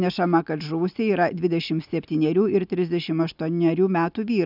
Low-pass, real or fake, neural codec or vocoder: 5.4 kHz; real; none